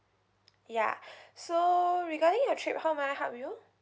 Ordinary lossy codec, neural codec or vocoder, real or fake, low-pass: none; none; real; none